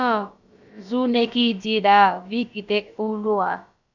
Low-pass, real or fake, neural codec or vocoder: 7.2 kHz; fake; codec, 16 kHz, about 1 kbps, DyCAST, with the encoder's durations